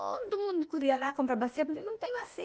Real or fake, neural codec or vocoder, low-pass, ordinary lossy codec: fake; codec, 16 kHz, 0.8 kbps, ZipCodec; none; none